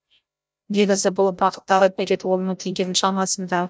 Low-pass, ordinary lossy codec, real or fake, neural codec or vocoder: none; none; fake; codec, 16 kHz, 0.5 kbps, FreqCodec, larger model